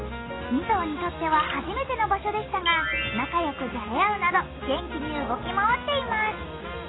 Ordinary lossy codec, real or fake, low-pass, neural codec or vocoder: AAC, 16 kbps; real; 7.2 kHz; none